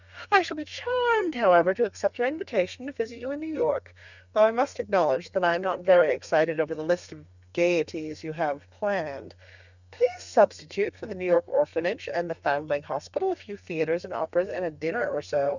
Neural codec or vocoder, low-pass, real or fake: codec, 32 kHz, 1.9 kbps, SNAC; 7.2 kHz; fake